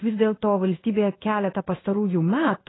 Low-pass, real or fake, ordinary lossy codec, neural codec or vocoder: 7.2 kHz; fake; AAC, 16 kbps; codec, 16 kHz in and 24 kHz out, 1 kbps, XY-Tokenizer